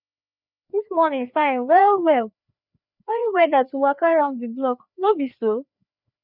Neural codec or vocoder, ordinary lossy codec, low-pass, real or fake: codec, 16 kHz, 2 kbps, FreqCodec, larger model; none; 5.4 kHz; fake